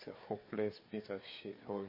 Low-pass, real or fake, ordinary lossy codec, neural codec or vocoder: 5.4 kHz; fake; MP3, 24 kbps; codec, 16 kHz, 4 kbps, FreqCodec, larger model